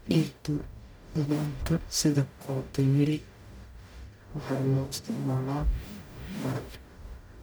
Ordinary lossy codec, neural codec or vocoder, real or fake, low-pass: none; codec, 44.1 kHz, 0.9 kbps, DAC; fake; none